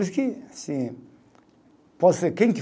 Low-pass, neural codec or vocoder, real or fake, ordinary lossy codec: none; none; real; none